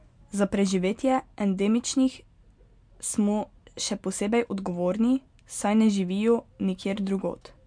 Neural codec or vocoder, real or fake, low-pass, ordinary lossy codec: none; real; 9.9 kHz; MP3, 64 kbps